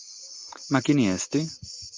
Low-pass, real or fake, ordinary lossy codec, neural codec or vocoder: 7.2 kHz; real; Opus, 24 kbps; none